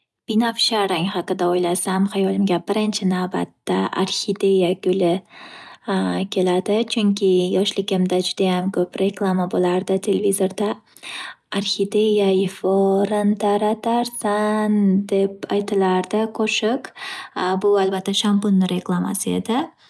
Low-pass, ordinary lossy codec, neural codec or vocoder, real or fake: none; none; none; real